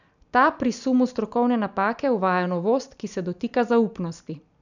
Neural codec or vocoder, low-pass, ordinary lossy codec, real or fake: none; 7.2 kHz; none; real